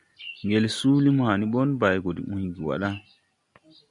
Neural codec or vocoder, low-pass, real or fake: none; 10.8 kHz; real